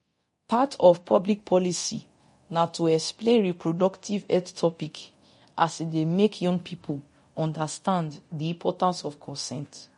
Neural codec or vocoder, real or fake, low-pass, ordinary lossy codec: codec, 24 kHz, 0.9 kbps, DualCodec; fake; 10.8 kHz; MP3, 48 kbps